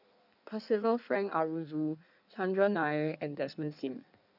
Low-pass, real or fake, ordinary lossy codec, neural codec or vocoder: 5.4 kHz; fake; none; codec, 16 kHz in and 24 kHz out, 1.1 kbps, FireRedTTS-2 codec